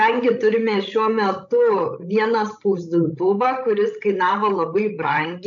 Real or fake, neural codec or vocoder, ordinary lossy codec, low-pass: fake; codec, 16 kHz, 16 kbps, FreqCodec, larger model; MP3, 64 kbps; 7.2 kHz